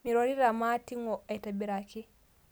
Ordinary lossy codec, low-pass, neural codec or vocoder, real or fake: none; none; none; real